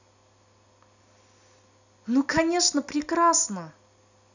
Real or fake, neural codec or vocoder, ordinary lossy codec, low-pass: real; none; none; 7.2 kHz